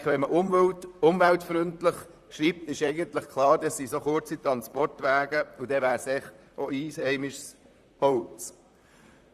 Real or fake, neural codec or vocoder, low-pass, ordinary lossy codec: fake; vocoder, 44.1 kHz, 128 mel bands, Pupu-Vocoder; 14.4 kHz; Opus, 64 kbps